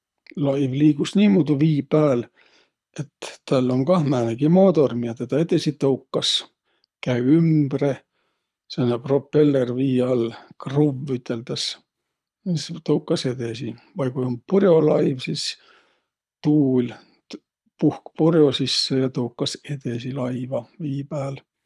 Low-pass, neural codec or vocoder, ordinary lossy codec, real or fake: none; codec, 24 kHz, 6 kbps, HILCodec; none; fake